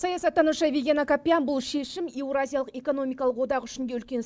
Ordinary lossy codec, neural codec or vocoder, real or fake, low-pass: none; none; real; none